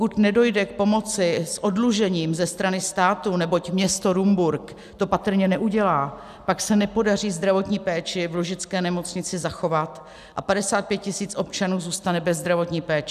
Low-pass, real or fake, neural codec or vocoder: 14.4 kHz; real; none